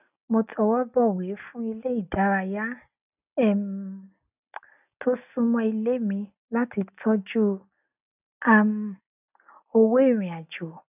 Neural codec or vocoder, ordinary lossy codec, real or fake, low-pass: none; none; real; 3.6 kHz